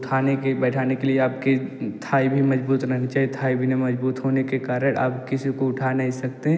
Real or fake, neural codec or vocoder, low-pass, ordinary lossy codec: real; none; none; none